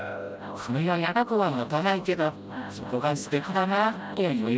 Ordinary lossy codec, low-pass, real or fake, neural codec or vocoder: none; none; fake; codec, 16 kHz, 0.5 kbps, FreqCodec, smaller model